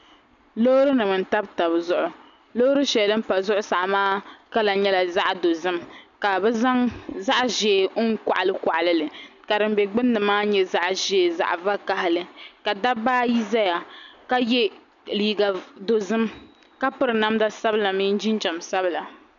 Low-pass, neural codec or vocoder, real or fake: 7.2 kHz; none; real